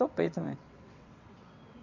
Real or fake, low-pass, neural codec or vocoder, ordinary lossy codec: fake; 7.2 kHz; vocoder, 22.05 kHz, 80 mel bands, WaveNeXt; none